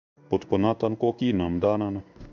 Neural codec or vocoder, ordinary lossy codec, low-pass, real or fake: codec, 16 kHz in and 24 kHz out, 1 kbps, XY-Tokenizer; none; 7.2 kHz; fake